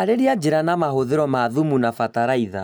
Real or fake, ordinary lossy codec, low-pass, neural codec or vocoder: real; none; none; none